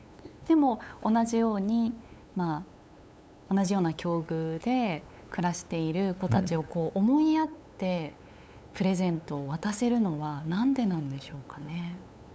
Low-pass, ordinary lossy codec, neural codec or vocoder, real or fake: none; none; codec, 16 kHz, 8 kbps, FunCodec, trained on LibriTTS, 25 frames a second; fake